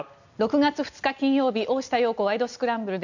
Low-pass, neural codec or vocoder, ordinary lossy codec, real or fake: 7.2 kHz; none; none; real